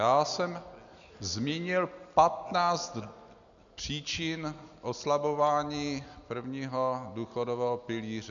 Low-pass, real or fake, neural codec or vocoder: 7.2 kHz; real; none